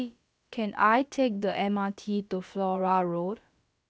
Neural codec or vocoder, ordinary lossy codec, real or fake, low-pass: codec, 16 kHz, about 1 kbps, DyCAST, with the encoder's durations; none; fake; none